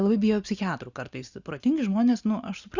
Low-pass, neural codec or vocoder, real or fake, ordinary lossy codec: 7.2 kHz; none; real; Opus, 64 kbps